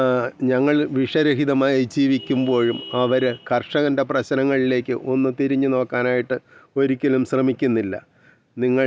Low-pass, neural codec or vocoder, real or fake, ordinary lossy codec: none; none; real; none